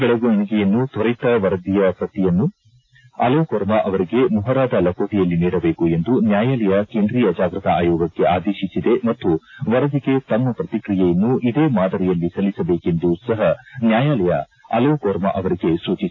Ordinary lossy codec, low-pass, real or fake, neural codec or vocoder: AAC, 32 kbps; 7.2 kHz; real; none